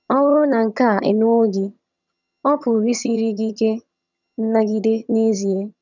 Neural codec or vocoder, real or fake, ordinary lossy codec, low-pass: vocoder, 22.05 kHz, 80 mel bands, HiFi-GAN; fake; none; 7.2 kHz